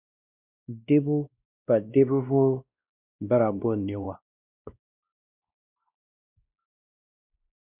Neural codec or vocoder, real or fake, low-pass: codec, 16 kHz, 1 kbps, X-Codec, WavLM features, trained on Multilingual LibriSpeech; fake; 3.6 kHz